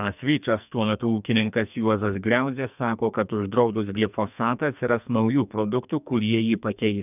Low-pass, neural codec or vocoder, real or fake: 3.6 kHz; codec, 44.1 kHz, 2.6 kbps, SNAC; fake